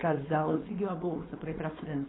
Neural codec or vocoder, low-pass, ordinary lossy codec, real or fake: codec, 16 kHz, 4.8 kbps, FACodec; 7.2 kHz; AAC, 16 kbps; fake